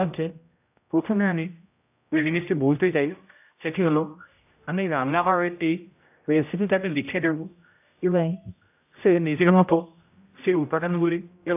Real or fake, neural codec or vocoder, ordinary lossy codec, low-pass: fake; codec, 16 kHz, 0.5 kbps, X-Codec, HuBERT features, trained on general audio; AAC, 32 kbps; 3.6 kHz